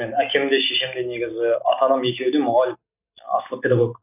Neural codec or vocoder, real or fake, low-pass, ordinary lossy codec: none; real; 3.6 kHz; none